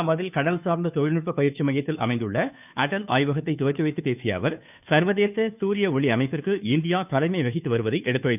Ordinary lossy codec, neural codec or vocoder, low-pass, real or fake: none; codec, 16 kHz, 2 kbps, FunCodec, trained on Chinese and English, 25 frames a second; 3.6 kHz; fake